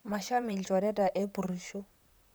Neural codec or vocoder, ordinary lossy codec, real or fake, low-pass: vocoder, 44.1 kHz, 128 mel bands, Pupu-Vocoder; none; fake; none